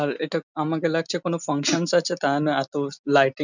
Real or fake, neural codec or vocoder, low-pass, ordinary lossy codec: real; none; 7.2 kHz; none